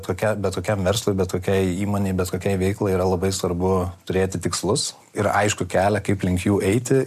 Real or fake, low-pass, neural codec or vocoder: real; 14.4 kHz; none